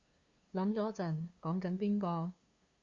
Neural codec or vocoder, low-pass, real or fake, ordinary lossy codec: codec, 16 kHz, 2 kbps, FunCodec, trained on LibriTTS, 25 frames a second; 7.2 kHz; fake; Opus, 64 kbps